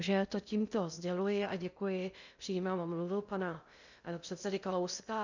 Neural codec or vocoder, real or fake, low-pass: codec, 16 kHz in and 24 kHz out, 0.6 kbps, FocalCodec, streaming, 2048 codes; fake; 7.2 kHz